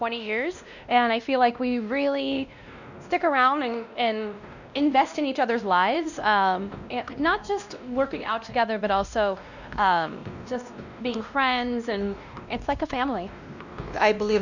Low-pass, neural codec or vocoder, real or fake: 7.2 kHz; codec, 16 kHz, 1 kbps, X-Codec, WavLM features, trained on Multilingual LibriSpeech; fake